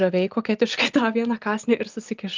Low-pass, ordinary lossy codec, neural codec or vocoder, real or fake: 7.2 kHz; Opus, 24 kbps; none; real